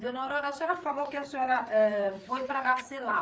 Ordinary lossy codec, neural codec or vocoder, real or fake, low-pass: none; codec, 16 kHz, 4 kbps, FreqCodec, larger model; fake; none